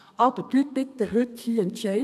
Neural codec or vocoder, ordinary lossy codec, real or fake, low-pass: codec, 32 kHz, 1.9 kbps, SNAC; none; fake; 14.4 kHz